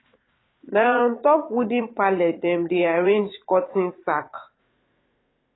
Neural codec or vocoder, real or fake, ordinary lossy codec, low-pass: vocoder, 44.1 kHz, 80 mel bands, Vocos; fake; AAC, 16 kbps; 7.2 kHz